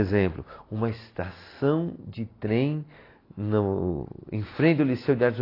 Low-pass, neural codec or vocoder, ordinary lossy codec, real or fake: 5.4 kHz; none; AAC, 24 kbps; real